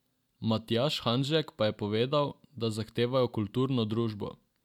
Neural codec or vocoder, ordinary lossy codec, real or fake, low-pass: none; none; real; 19.8 kHz